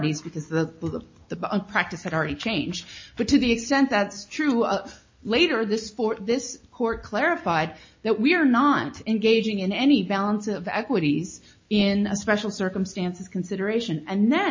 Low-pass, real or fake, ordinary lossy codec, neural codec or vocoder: 7.2 kHz; real; MP3, 32 kbps; none